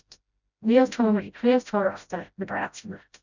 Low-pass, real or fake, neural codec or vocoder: 7.2 kHz; fake; codec, 16 kHz, 0.5 kbps, FreqCodec, smaller model